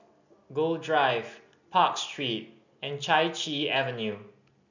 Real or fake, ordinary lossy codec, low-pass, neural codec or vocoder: real; none; 7.2 kHz; none